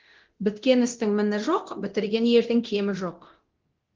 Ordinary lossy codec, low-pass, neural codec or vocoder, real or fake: Opus, 16 kbps; 7.2 kHz; codec, 24 kHz, 0.9 kbps, DualCodec; fake